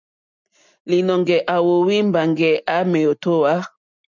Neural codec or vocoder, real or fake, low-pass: none; real; 7.2 kHz